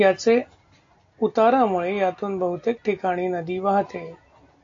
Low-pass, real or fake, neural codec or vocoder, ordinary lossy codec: 7.2 kHz; real; none; AAC, 48 kbps